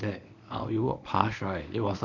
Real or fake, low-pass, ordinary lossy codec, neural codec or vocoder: fake; 7.2 kHz; none; codec, 24 kHz, 0.9 kbps, WavTokenizer, medium speech release version 1